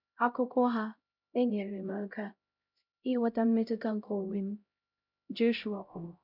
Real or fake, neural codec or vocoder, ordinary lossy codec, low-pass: fake; codec, 16 kHz, 0.5 kbps, X-Codec, HuBERT features, trained on LibriSpeech; none; 5.4 kHz